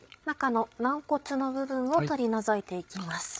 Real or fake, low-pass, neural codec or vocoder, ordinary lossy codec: fake; none; codec, 16 kHz, 8 kbps, FreqCodec, larger model; none